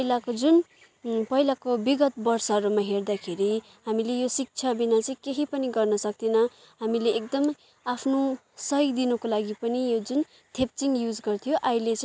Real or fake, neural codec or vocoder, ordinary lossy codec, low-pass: real; none; none; none